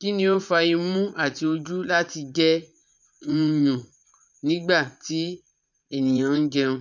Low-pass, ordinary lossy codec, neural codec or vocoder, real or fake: 7.2 kHz; none; vocoder, 44.1 kHz, 80 mel bands, Vocos; fake